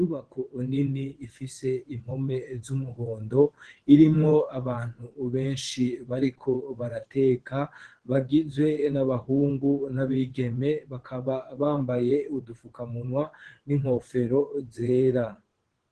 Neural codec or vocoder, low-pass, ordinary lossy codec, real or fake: vocoder, 22.05 kHz, 80 mel bands, WaveNeXt; 9.9 kHz; Opus, 16 kbps; fake